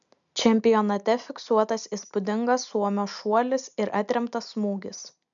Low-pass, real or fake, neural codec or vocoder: 7.2 kHz; real; none